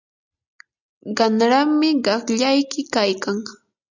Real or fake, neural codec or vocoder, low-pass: real; none; 7.2 kHz